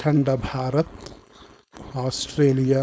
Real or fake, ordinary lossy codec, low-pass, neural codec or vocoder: fake; none; none; codec, 16 kHz, 4.8 kbps, FACodec